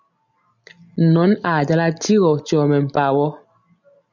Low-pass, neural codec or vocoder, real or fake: 7.2 kHz; none; real